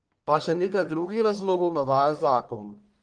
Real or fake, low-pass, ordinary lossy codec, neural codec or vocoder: fake; 9.9 kHz; Opus, 24 kbps; codec, 44.1 kHz, 1.7 kbps, Pupu-Codec